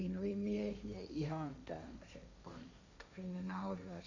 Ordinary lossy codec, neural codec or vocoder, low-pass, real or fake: AAC, 48 kbps; codec, 16 kHz, 1.1 kbps, Voila-Tokenizer; 7.2 kHz; fake